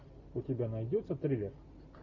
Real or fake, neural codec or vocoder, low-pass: real; none; 7.2 kHz